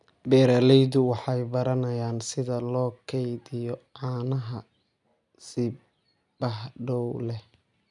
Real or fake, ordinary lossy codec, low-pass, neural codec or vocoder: real; none; 10.8 kHz; none